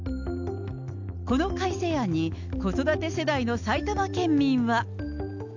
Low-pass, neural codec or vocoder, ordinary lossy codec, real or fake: 7.2 kHz; none; none; real